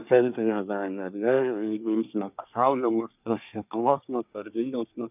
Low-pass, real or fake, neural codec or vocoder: 3.6 kHz; fake; codec, 24 kHz, 1 kbps, SNAC